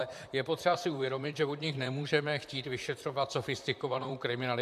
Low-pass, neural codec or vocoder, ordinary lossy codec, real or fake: 14.4 kHz; vocoder, 44.1 kHz, 128 mel bands, Pupu-Vocoder; MP3, 96 kbps; fake